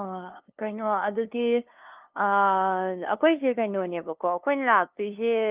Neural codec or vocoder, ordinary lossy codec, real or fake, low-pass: codec, 16 kHz, 2 kbps, FunCodec, trained on LibriTTS, 25 frames a second; Opus, 32 kbps; fake; 3.6 kHz